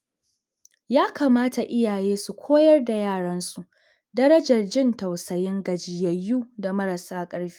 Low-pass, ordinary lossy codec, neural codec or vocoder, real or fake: 19.8 kHz; Opus, 32 kbps; autoencoder, 48 kHz, 128 numbers a frame, DAC-VAE, trained on Japanese speech; fake